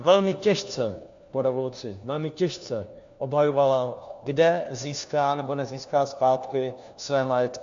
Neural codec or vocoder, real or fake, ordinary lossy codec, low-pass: codec, 16 kHz, 1 kbps, FunCodec, trained on LibriTTS, 50 frames a second; fake; AAC, 64 kbps; 7.2 kHz